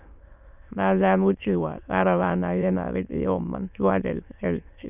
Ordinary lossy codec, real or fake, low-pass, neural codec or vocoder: none; fake; 3.6 kHz; autoencoder, 22.05 kHz, a latent of 192 numbers a frame, VITS, trained on many speakers